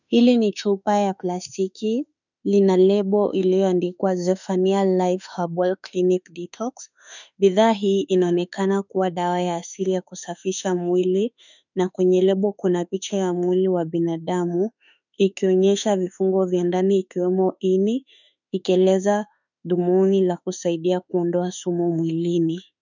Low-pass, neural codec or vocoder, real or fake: 7.2 kHz; autoencoder, 48 kHz, 32 numbers a frame, DAC-VAE, trained on Japanese speech; fake